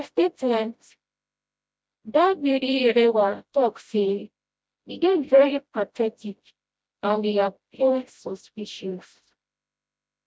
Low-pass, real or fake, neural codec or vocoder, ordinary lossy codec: none; fake; codec, 16 kHz, 0.5 kbps, FreqCodec, smaller model; none